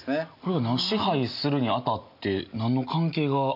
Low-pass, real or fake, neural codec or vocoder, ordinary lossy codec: 5.4 kHz; real; none; MP3, 48 kbps